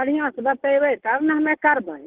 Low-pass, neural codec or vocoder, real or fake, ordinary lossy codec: 3.6 kHz; none; real; Opus, 16 kbps